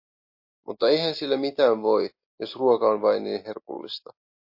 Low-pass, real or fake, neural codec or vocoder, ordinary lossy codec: 5.4 kHz; real; none; MP3, 32 kbps